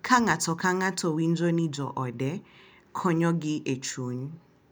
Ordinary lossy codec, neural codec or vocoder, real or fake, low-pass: none; none; real; none